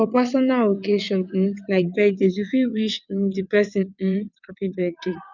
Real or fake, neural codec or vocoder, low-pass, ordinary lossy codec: fake; vocoder, 22.05 kHz, 80 mel bands, WaveNeXt; 7.2 kHz; none